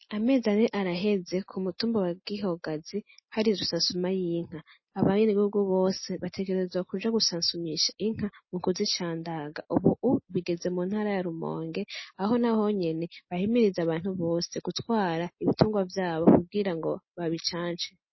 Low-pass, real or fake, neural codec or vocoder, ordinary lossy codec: 7.2 kHz; real; none; MP3, 24 kbps